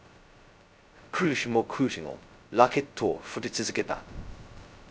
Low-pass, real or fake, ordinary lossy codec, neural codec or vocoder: none; fake; none; codec, 16 kHz, 0.2 kbps, FocalCodec